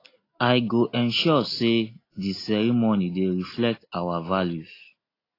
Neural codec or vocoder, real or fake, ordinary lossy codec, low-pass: none; real; AAC, 24 kbps; 5.4 kHz